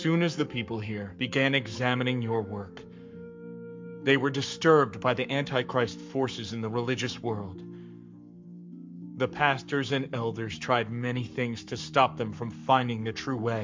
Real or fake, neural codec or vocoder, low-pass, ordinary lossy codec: fake; codec, 44.1 kHz, 7.8 kbps, Pupu-Codec; 7.2 kHz; MP3, 64 kbps